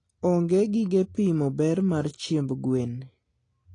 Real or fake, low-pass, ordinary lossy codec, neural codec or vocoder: real; 9.9 kHz; AAC, 32 kbps; none